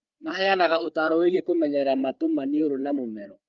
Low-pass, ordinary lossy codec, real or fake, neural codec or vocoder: 7.2 kHz; Opus, 32 kbps; fake; codec, 16 kHz, 4 kbps, FreqCodec, larger model